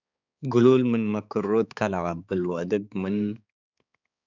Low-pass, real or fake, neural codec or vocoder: 7.2 kHz; fake; codec, 16 kHz, 4 kbps, X-Codec, HuBERT features, trained on balanced general audio